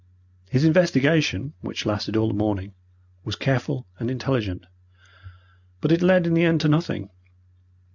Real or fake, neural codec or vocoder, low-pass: real; none; 7.2 kHz